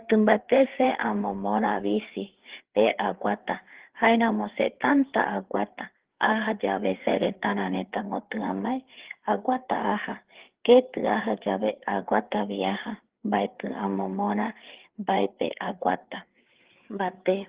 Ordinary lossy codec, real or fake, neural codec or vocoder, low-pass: Opus, 16 kbps; fake; codec, 16 kHz, 4 kbps, FreqCodec, larger model; 3.6 kHz